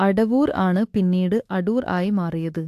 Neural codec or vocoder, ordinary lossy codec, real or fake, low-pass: autoencoder, 48 kHz, 32 numbers a frame, DAC-VAE, trained on Japanese speech; AAC, 64 kbps; fake; 14.4 kHz